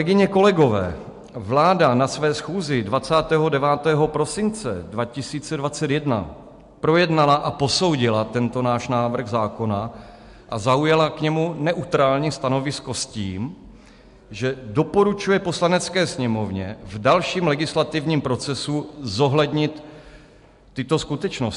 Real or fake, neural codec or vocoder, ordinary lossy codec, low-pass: real; none; MP3, 64 kbps; 10.8 kHz